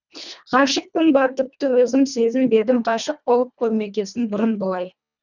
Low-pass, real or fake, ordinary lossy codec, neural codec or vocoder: 7.2 kHz; fake; none; codec, 24 kHz, 1.5 kbps, HILCodec